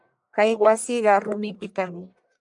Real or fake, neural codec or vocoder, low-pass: fake; codec, 44.1 kHz, 1.7 kbps, Pupu-Codec; 10.8 kHz